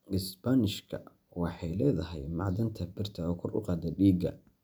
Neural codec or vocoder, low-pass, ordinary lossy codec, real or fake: none; none; none; real